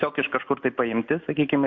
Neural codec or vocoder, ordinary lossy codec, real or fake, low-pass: none; MP3, 48 kbps; real; 7.2 kHz